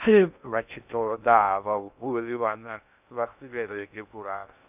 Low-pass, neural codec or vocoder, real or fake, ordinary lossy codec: 3.6 kHz; codec, 16 kHz in and 24 kHz out, 0.6 kbps, FocalCodec, streaming, 2048 codes; fake; none